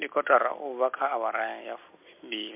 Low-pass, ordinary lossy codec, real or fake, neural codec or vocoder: 3.6 kHz; MP3, 32 kbps; real; none